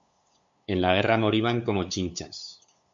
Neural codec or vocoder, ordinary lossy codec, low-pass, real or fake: codec, 16 kHz, 8 kbps, FunCodec, trained on LibriTTS, 25 frames a second; MP3, 64 kbps; 7.2 kHz; fake